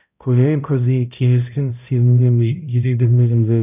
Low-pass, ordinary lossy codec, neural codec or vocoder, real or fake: 3.6 kHz; none; codec, 16 kHz, 0.5 kbps, X-Codec, HuBERT features, trained on balanced general audio; fake